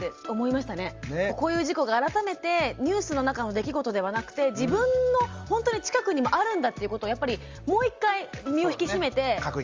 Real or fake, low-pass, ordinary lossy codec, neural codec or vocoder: real; 7.2 kHz; Opus, 32 kbps; none